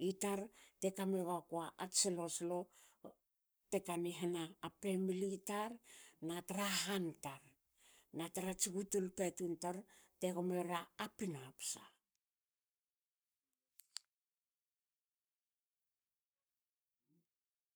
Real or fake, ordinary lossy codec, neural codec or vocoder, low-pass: fake; none; codec, 44.1 kHz, 7.8 kbps, Pupu-Codec; none